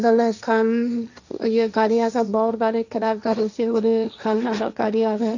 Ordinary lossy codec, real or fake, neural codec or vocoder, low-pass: none; fake; codec, 16 kHz, 1.1 kbps, Voila-Tokenizer; 7.2 kHz